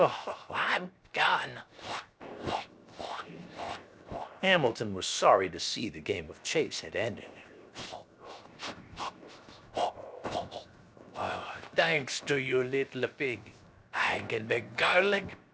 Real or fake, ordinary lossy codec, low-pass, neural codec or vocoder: fake; none; none; codec, 16 kHz, 0.7 kbps, FocalCodec